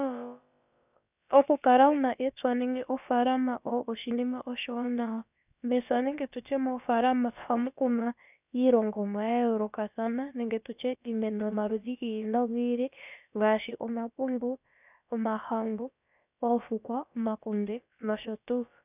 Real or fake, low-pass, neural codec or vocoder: fake; 3.6 kHz; codec, 16 kHz, about 1 kbps, DyCAST, with the encoder's durations